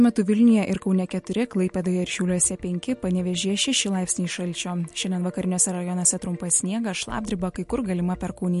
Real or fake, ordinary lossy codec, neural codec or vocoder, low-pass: real; MP3, 48 kbps; none; 14.4 kHz